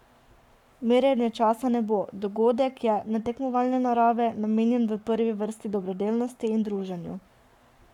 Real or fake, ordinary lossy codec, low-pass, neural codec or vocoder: fake; none; 19.8 kHz; codec, 44.1 kHz, 7.8 kbps, Pupu-Codec